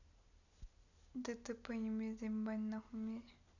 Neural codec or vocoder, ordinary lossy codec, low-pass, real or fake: none; none; 7.2 kHz; real